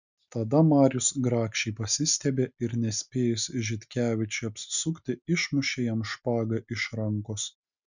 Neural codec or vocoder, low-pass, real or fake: none; 7.2 kHz; real